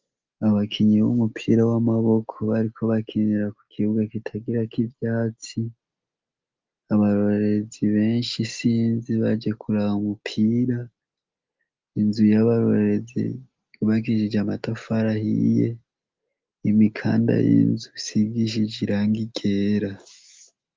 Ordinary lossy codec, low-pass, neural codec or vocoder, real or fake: Opus, 24 kbps; 7.2 kHz; none; real